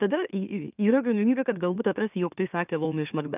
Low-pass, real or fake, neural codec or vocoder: 3.6 kHz; fake; autoencoder, 44.1 kHz, a latent of 192 numbers a frame, MeloTTS